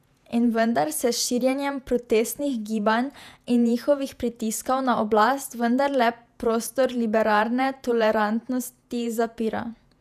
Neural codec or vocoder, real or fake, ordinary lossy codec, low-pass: vocoder, 48 kHz, 128 mel bands, Vocos; fake; none; 14.4 kHz